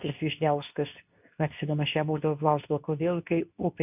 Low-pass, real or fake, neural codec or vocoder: 3.6 kHz; fake; codec, 24 kHz, 0.9 kbps, WavTokenizer, medium speech release version 2